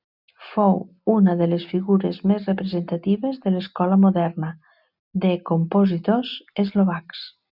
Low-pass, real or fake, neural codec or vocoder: 5.4 kHz; real; none